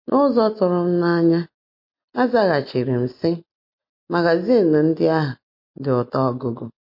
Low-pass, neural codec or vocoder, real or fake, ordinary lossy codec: 5.4 kHz; none; real; MP3, 32 kbps